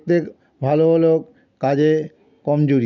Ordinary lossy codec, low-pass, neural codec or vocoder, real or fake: none; 7.2 kHz; none; real